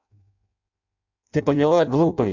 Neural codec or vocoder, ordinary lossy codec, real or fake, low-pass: codec, 16 kHz in and 24 kHz out, 0.6 kbps, FireRedTTS-2 codec; none; fake; 7.2 kHz